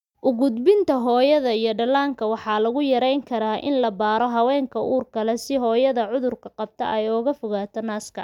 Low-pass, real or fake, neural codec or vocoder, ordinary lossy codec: 19.8 kHz; real; none; none